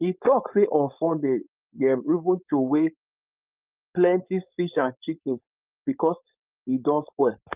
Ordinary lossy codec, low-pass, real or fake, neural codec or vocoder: Opus, 24 kbps; 3.6 kHz; fake; codec, 16 kHz, 4.8 kbps, FACodec